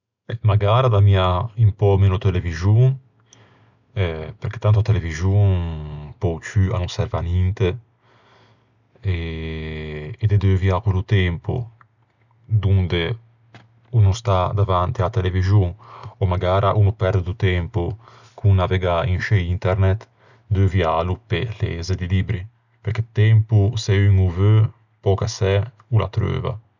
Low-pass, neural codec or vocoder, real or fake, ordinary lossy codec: 7.2 kHz; none; real; none